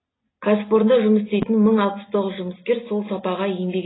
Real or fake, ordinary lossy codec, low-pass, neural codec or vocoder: real; AAC, 16 kbps; 7.2 kHz; none